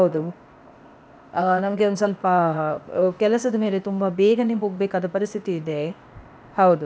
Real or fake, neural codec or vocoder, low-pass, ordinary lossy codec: fake; codec, 16 kHz, 0.8 kbps, ZipCodec; none; none